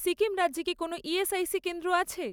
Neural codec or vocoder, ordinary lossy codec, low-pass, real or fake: none; none; none; real